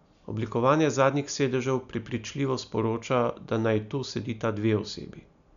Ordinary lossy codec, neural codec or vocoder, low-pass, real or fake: none; none; 7.2 kHz; real